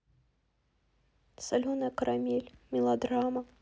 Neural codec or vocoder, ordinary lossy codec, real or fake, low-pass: none; none; real; none